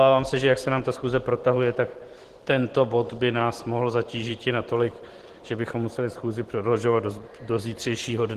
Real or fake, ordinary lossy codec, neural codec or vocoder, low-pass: fake; Opus, 16 kbps; vocoder, 44.1 kHz, 128 mel bands every 512 samples, BigVGAN v2; 14.4 kHz